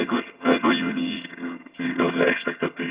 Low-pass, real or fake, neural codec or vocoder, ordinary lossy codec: 3.6 kHz; fake; vocoder, 22.05 kHz, 80 mel bands, HiFi-GAN; Opus, 64 kbps